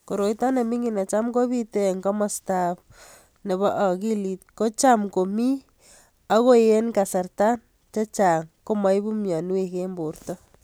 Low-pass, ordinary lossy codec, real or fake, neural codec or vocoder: none; none; real; none